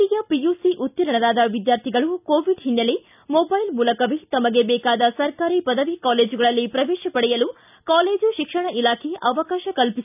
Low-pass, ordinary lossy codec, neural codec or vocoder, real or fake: 3.6 kHz; none; none; real